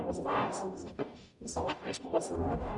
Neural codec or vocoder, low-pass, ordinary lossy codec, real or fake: codec, 44.1 kHz, 0.9 kbps, DAC; 10.8 kHz; MP3, 96 kbps; fake